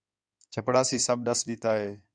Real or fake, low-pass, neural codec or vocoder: fake; 9.9 kHz; codec, 16 kHz in and 24 kHz out, 2.2 kbps, FireRedTTS-2 codec